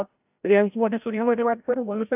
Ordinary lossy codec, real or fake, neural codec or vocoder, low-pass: AAC, 32 kbps; fake; codec, 16 kHz, 0.5 kbps, FreqCodec, larger model; 3.6 kHz